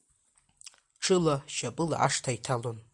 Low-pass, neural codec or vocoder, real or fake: 10.8 kHz; none; real